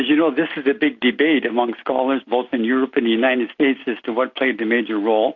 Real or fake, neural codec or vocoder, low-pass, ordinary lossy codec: real; none; 7.2 kHz; AAC, 48 kbps